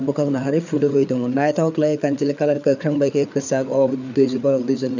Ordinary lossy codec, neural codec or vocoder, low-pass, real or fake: none; codec, 16 kHz, 4 kbps, FreqCodec, larger model; 7.2 kHz; fake